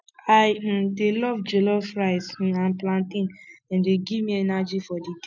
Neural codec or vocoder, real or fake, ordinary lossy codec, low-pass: none; real; none; 7.2 kHz